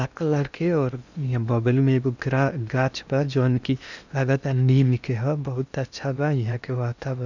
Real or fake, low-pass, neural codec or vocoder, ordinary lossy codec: fake; 7.2 kHz; codec, 16 kHz in and 24 kHz out, 0.8 kbps, FocalCodec, streaming, 65536 codes; none